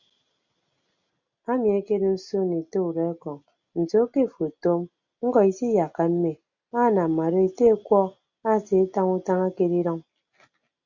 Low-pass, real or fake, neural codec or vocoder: 7.2 kHz; real; none